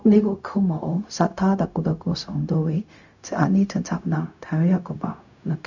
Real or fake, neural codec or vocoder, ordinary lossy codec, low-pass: fake; codec, 16 kHz, 0.4 kbps, LongCat-Audio-Codec; none; 7.2 kHz